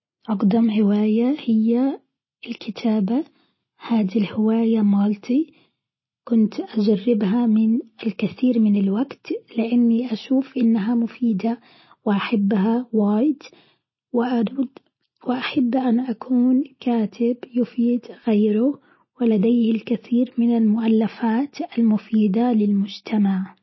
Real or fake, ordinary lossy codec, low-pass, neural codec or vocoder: real; MP3, 24 kbps; 7.2 kHz; none